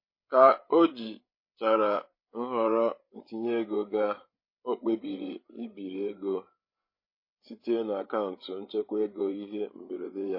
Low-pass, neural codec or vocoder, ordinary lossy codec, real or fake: 5.4 kHz; codec, 16 kHz, 8 kbps, FreqCodec, larger model; MP3, 24 kbps; fake